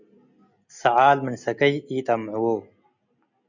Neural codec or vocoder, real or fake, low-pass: none; real; 7.2 kHz